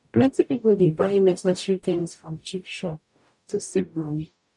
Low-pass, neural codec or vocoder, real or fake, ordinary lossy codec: 10.8 kHz; codec, 44.1 kHz, 0.9 kbps, DAC; fake; none